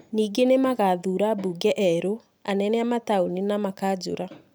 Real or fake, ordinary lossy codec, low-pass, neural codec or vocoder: real; none; none; none